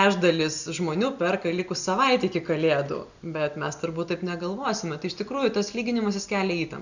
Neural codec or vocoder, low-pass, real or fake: none; 7.2 kHz; real